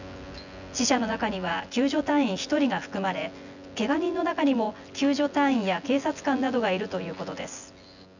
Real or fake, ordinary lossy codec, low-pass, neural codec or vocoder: fake; none; 7.2 kHz; vocoder, 24 kHz, 100 mel bands, Vocos